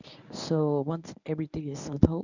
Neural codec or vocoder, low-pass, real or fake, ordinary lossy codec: codec, 24 kHz, 0.9 kbps, WavTokenizer, medium speech release version 1; 7.2 kHz; fake; none